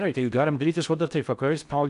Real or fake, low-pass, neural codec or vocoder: fake; 10.8 kHz; codec, 16 kHz in and 24 kHz out, 0.6 kbps, FocalCodec, streaming, 4096 codes